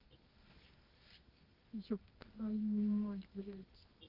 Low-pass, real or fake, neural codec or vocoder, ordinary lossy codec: 5.4 kHz; fake; codec, 24 kHz, 0.9 kbps, WavTokenizer, medium music audio release; Opus, 32 kbps